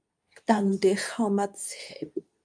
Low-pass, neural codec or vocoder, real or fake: 9.9 kHz; codec, 24 kHz, 0.9 kbps, WavTokenizer, medium speech release version 2; fake